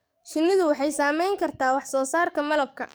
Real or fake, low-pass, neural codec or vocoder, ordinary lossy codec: fake; none; codec, 44.1 kHz, 7.8 kbps, DAC; none